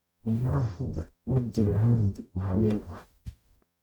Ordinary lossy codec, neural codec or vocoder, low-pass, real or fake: none; codec, 44.1 kHz, 0.9 kbps, DAC; 19.8 kHz; fake